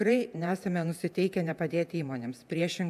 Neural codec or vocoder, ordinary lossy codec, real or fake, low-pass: vocoder, 48 kHz, 128 mel bands, Vocos; AAC, 96 kbps; fake; 14.4 kHz